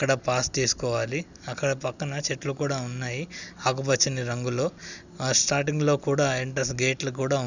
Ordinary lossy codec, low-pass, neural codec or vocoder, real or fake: none; 7.2 kHz; none; real